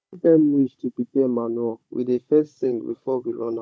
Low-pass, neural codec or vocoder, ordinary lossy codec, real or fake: none; codec, 16 kHz, 16 kbps, FunCodec, trained on Chinese and English, 50 frames a second; none; fake